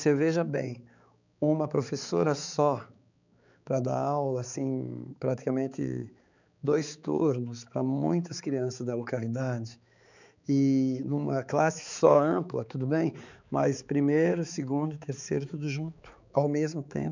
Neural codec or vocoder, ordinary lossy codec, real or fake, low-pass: codec, 16 kHz, 4 kbps, X-Codec, HuBERT features, trained on balanced general audio; none; fake; 7.2 kHz